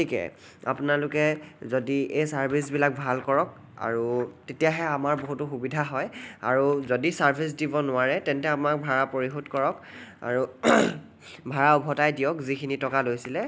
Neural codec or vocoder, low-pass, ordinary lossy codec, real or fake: none; none; none; real